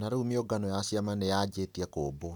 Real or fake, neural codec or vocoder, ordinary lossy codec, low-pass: real; none; none; none